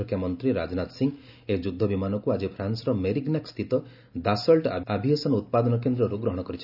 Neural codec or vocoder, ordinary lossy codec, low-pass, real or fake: none; none; 5.4 kHz; real